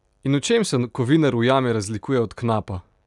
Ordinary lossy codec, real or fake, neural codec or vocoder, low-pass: none; real; none; 10.8 kHz